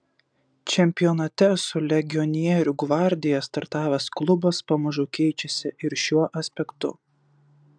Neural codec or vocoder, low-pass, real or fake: none; 9.9 kHz; real